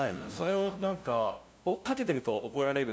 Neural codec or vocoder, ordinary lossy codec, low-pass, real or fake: codec, 16 kHz, 0.5 kbps, FunCodec, trained on LibriTTS, 25 frames a second; none; none; fake